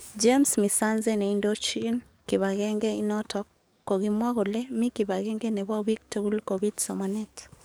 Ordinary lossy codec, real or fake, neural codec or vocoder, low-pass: none; fake; codec, 44.1 kHz, 7.8 kbps, DAC; none